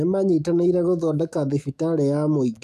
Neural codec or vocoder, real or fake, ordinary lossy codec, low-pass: autoencoder, 48 kHz, 128 numbers a frame, DAC-VAE, trained on Japanese speech; fake; none; 14.4 kHz